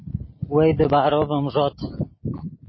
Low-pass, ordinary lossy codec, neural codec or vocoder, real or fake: 7.2 kHz; MP3, 24 kbps; none; real